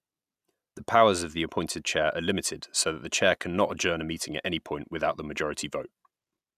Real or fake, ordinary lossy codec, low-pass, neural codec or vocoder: real; none; 14.4 kHz; none